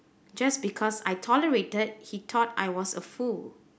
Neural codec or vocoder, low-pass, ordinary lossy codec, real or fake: none; none; none; real